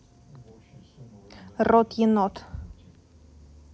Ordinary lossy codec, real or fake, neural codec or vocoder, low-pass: none; real; none; none